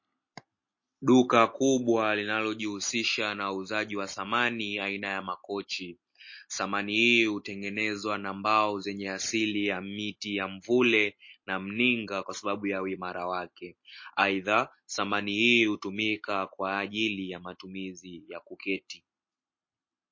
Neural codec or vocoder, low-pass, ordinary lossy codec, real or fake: none; 7.2 kHz; MP3, 32 kbps; real